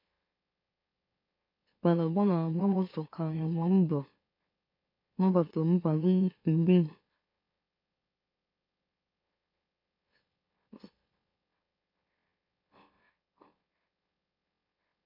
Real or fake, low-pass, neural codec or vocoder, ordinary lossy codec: fake; 5.4 kHz; autoencoder, 44.1 kHz, a latent of 192 numbers a frame, MeloTTS; MP3, 32 kbps